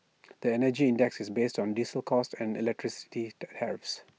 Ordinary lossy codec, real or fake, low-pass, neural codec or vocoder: none; real; none; none